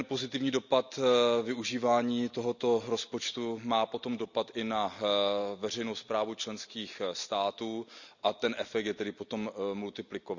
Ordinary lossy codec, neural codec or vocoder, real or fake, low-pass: none; none; real; 7.2 kHz